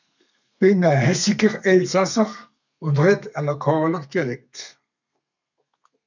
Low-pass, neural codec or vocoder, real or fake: 7.2 kHz; codec, 32 kHz, 1.9 kbps, SNAC; fake